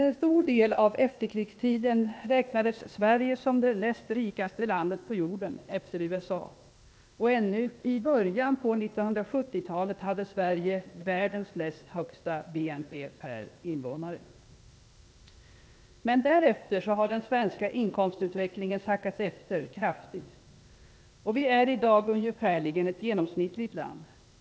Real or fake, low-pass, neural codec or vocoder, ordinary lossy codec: fake; none; codec, 16 kHz, 0.8 kbps, ZipCodec; none